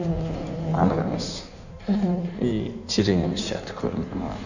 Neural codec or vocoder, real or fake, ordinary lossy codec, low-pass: codec, 16 kHz in and 24 kHz out, 1.1 kbps, FireRedTTS-2 codec; fake; none; 7.2 kHz